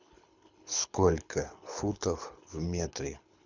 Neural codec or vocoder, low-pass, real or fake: codec, 24 kHz, 6 kbps, HILCodec; 7.2 kHz; fake